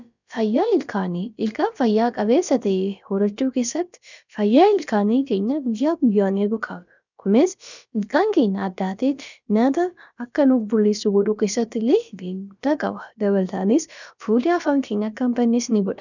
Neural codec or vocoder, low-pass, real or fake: codec, 16 kHz, about 1 kbps, DyCAST, with the encoder's durations; 7.2 kHz; fake